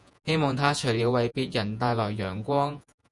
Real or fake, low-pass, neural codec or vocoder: fake; 10.8 kHz; vocoder, 48 kHz, 128 mel bands, Vocos